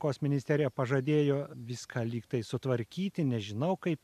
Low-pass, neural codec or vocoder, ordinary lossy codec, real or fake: 14.4 kHz; none; MP3, 96 kbps; real